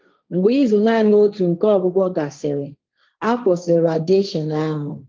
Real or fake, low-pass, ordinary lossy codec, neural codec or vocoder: fake; 7.2 kHz; Opus, 32 kbps; codec, 16 kHz, 1.1 kbps, Voila-Tokenizer